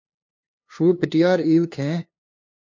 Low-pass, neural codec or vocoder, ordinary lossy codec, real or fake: 7.2 kHz; codec, 16 kHz, 2 kbps, FunCodec, trained on LibriTTS, 25 frames a second; MP3, 48 kbps; fake